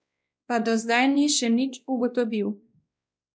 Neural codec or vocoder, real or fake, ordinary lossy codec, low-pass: codec, 16 kHz, 1 kbps, X-Codec, WavLM features, trained on Multilingual LibriSpeech; fake; none; none